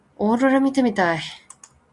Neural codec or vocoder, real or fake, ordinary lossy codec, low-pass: none; real; Opus, 64 kbps; 10.8 kHz